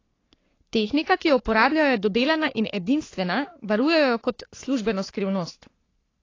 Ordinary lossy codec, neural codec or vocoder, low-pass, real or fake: AAC, 32 kbps; codec, 44.1 kHz, 3.4 kbps, Pupu-Codec; 7.2 kHz; fake